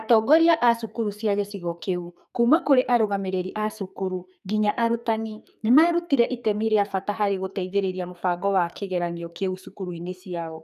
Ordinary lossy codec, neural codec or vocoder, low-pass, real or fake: none; codec, 44.1 kHz, 2.6 kbps, SNAC; 14.4 kHz; fake